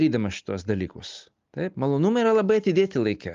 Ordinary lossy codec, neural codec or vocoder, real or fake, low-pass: Opus, 32 kbps; none; real; 7.2 kHz